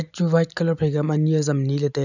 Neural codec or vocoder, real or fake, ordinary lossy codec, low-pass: none; real; none; 7.2 kHz